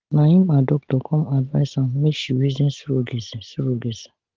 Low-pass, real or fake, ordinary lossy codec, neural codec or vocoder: 7.2 kHz; real; Opus, 16 kbps; none